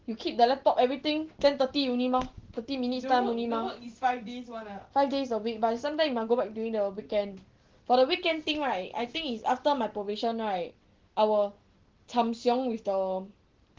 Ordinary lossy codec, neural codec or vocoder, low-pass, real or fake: Opus, 16 kbps; none; 7.2 kHz; real